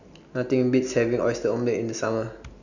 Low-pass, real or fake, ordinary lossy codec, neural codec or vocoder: 7.2 kHz; real; none; none